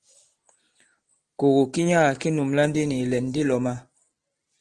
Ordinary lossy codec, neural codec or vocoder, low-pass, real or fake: Opus, 16 kbps; none; 9.9 kHz; real